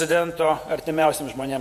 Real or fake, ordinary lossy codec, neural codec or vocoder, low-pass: fake; MP3, 64 kbps; vocoder, 48 kHz, 128 mel bands, Vocos; 14.4 kHz